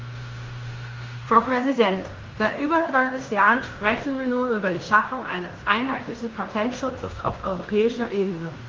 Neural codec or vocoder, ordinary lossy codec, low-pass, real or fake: codec, 16 kHz in and 24 kHz out, 0.9 kbps, LongCat-Audio-Codec, fine tuned four codebook decoder; Opus, 32 kbps; 7.2 kHz; fake